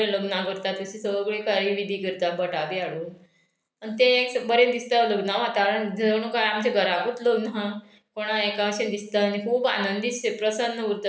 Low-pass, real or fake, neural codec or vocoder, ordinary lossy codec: none; real; none; none